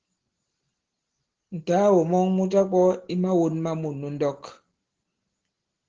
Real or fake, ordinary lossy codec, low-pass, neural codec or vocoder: real; Opus, 16 kbps; 7.2 kHz; none